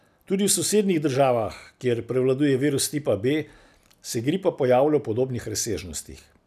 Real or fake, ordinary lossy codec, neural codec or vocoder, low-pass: real; none; none; 14.4 kHz